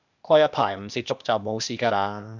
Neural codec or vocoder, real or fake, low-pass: codec, 16 kHz, 0.8 kbps, ZipCodec; fake; 7.2 kHz